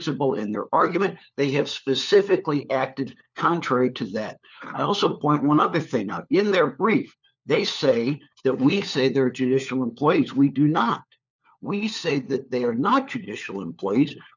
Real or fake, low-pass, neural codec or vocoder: fake; 7.2 kHz; codec, 16 kHz, 4 kbps, FunCodec, trained on LibriTTS, 50 frames a second